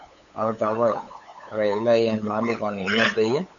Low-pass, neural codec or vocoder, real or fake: 7.2 kHz; codec, 16 kHz, 8 kbps, FunCodec, trained on LibriTTS, 25 frames a second; fake